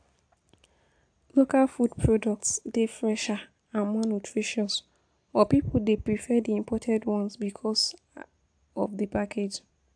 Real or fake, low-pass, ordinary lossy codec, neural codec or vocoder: real; 9.9 kHz; AAC, 64 kbps; none